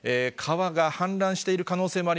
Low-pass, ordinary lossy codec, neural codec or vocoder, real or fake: none; none; none; real